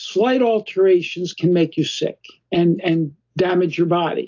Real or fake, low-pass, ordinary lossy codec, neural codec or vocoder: real; 7.2 kHz; AAC, 48 kbps; none